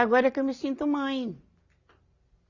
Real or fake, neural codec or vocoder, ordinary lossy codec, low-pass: real; none; Opus, 64 kbps; 7.2 kHz